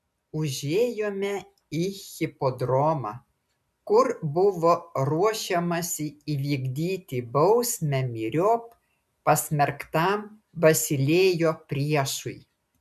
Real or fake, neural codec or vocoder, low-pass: real; none; 14.4 kHz